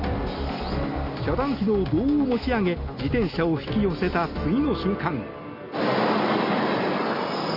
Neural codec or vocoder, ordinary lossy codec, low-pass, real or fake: none; Opus, 64 kbps; 5.4 kHz; real